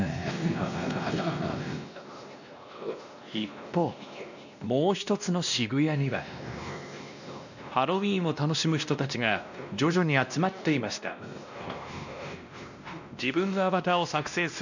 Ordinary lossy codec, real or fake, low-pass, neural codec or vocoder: none; fake; 7.2 kHz; codec, 16 kHz, 1 kbps, X-Codec, WavLM features, trained on Multilingual LibriSpeech